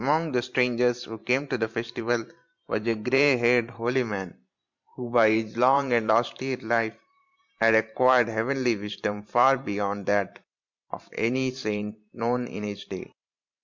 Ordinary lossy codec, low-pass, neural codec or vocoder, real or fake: MP3, 64 kbps; 7.2 kHz; vocoder, 44.1 kHz, 128 mel bands every 512 samples, BigVGAN v2; fake